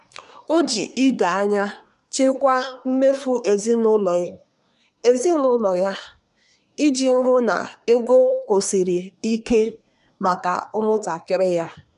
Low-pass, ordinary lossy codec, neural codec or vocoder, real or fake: 10.8 kHz; none; codec, 24 kHz, 1 kbps, SNAC; fake